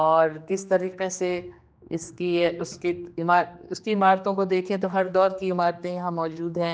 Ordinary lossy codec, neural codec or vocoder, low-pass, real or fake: none; codec, 16 kHz, 2 kbps, X-Codec, HuBERT features, trained on general audio; none; fake